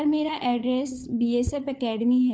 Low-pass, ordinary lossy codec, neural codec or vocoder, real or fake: none; none; codec, 16 kHz, 4 kbps, FunCodec, trained on LibriTTS, 50 frames a second; fake